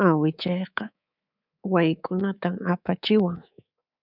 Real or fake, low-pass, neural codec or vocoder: fake; 5.4 kHz; codec, 24 kHz, 3.1 kbps, DualCodec